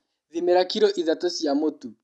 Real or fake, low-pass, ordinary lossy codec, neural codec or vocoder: real; none; none; none